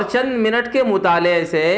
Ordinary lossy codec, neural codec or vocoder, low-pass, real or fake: none; none; none; real